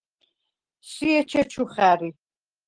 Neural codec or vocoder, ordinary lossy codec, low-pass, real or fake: none; Opus, 16 kbps; 9.9 kHz; real